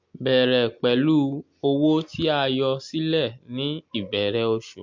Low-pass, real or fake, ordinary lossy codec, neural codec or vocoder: 7.2 kHz; real; MP3, 48 kbps; none